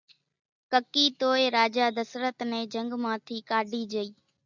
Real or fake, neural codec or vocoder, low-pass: real; none; 7.2 kHz